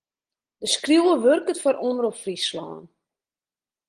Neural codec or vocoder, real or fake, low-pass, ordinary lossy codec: none; real; 9.9 kHz; Opus, 24 kbps